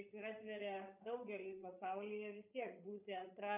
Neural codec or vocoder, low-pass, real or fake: codec, 16 kHz, 8 kbps, FreqCodec, larger model; 3.6 kHz; fake